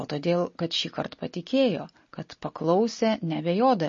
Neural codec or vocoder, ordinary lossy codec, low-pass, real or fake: none; MP3, 32 kbps; 7.2 kHz; real